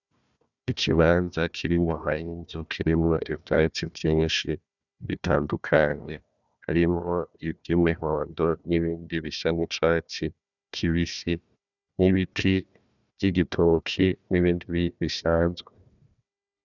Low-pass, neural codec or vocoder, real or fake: 7.2 kHz; codec, 16 kHz, 1 kbps, FunCodec, trained on Chinese and English, 50 frames a second; fake